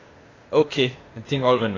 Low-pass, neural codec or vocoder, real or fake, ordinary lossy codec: 7.2 kHz; codec, 16 kHz, 0.8 kbps, ZipCodec; fake; AAC, 32 kbps